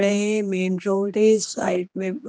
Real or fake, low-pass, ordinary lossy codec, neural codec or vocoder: fake; none; none; codec, 16 kHz, 2 kbps, X-Codec, HuBERT features, trained on general audio